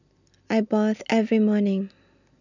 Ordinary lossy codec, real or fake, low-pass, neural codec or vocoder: none; real; 7.2 kHz; none